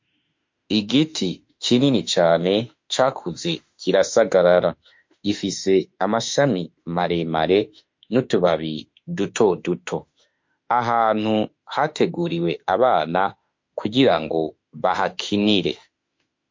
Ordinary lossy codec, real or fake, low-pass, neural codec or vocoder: MP3, 48 kbps; fake; 7.2 kHz; autoencoder, 48 kHz, 32 numbers a frame, DAC-VAE, trained on Japanese speech